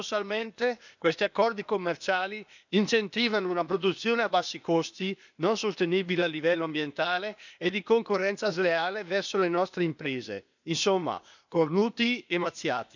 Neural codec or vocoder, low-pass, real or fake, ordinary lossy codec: codec, 16 kHz, 0.8 kbps, ZipCodec; 7.2 kHz; fake; none